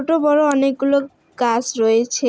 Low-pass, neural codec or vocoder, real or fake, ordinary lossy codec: none; none; real; none